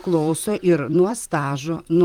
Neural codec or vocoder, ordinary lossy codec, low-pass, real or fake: vocoder, 44.1 kHz, 128 mel bands, Pupu-Vocoder; Opus, 24 kbps; 19.8 kHz; fake